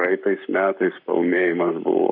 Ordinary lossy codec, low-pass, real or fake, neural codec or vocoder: AAC, 48 kbps; 5.4 kHz; fake; codec, 44.1 kHz, 7.8 kbps, Pupu-Codec